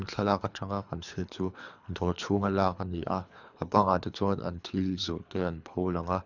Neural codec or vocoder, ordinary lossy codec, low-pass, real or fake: codec, 24 kHz, 3 kbps, HILCodec; none; 7.2 kHz; fake